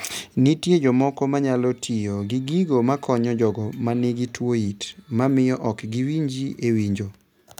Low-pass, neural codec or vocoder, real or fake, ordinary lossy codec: 19.8 kHz; none; real; none